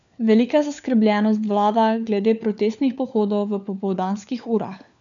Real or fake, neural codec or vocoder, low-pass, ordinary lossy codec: fake; codec, 16 kHz, 4 kbps, FunCodec, trained on LibriTTS, 50 frames a second; 7.2 kHz; none